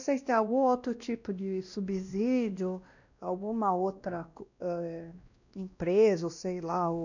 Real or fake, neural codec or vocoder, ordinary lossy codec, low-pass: fake; codec, 16 kHz, 1 kbps, X-Codec, WavLM features, trained on Multilingual LibriSpeech; none; 7.2 kHz